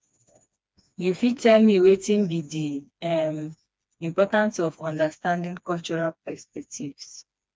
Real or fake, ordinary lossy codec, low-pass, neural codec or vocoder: fake; none; none; codec, 16 kHz, 2 kbps, FreqCodec, smaller model